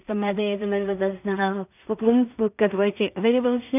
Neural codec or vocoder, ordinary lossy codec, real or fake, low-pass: codec, 16 kHz in and 24 kHz out, 0.4 kbps, LongCat-Audio-Codec, two codebook decoder; none; fake; 3.6 kHz